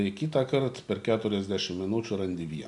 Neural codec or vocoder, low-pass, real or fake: none; 10.8 kHz; real